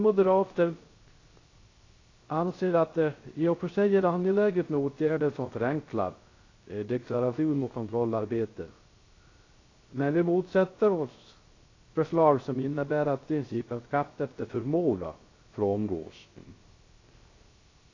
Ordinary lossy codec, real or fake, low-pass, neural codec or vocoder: AAC, 32 kbps; fake; 7.2 kHz; codec, 16 kHz, 0.3 kbps, FocalCodec